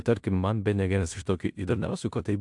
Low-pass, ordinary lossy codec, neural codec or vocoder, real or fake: 10.8 kHz; AAC, 64 kbps; codec, 16 kHz in and 24 kHz out, 0.9 kbps, LongCat-Audio-Codec, fine tuned four codebook decoder; fake